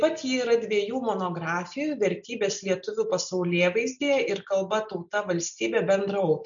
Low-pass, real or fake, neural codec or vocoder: 7.2 kHz; real; none